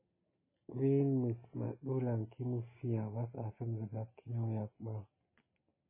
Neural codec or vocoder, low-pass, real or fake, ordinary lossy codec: none; 3.6 kHz; real; MP3, 16 kbps